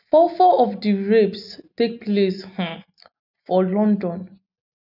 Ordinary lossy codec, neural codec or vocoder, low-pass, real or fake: none; none; 5.4 kHz; real